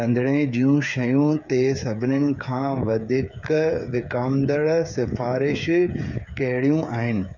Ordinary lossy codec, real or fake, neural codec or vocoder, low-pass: none; fake; codec, 16 kHz, 8 kbps, FreqCodec, smaller model; 7.2 kHz